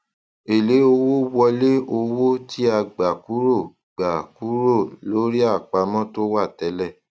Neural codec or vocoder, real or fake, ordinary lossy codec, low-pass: none; real; none; none